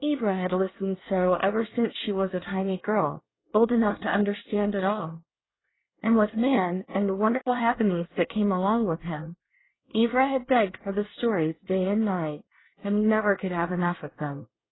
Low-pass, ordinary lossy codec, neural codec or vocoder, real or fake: 7.2 kHz; AAC, 16 kbps; codec, 24 kHz, 1 kbps, SNAC; fake